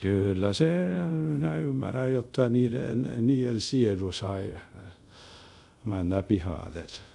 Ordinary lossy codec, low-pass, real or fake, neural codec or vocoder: none; 10.8 kHz; fake; codec, 24 kHz, 0.5 kbps, DualCodec